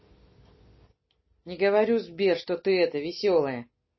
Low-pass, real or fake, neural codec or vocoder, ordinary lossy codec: 7.2 kHz; real; none; MP3, 24 kbps